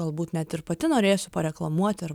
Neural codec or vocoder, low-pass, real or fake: none; 19.8 kHz; real